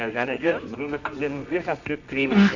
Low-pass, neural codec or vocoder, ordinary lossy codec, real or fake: 7.2 kHz; codec, 24 kHz, 0.9 kbps, WavTokenizer, medium music audio release; none; fake